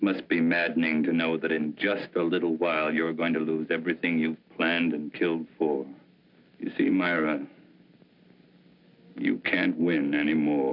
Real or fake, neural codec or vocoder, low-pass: real; none; 5.4 kHz